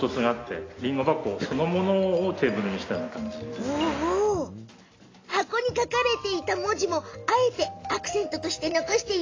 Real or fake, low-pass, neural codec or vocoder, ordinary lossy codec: real; 7.2 kHz; none; AAC, 32 kbps